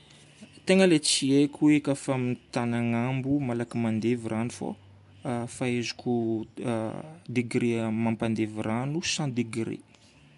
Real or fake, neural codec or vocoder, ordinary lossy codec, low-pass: real; none; MP3, 64 kbps; 10.8 kHz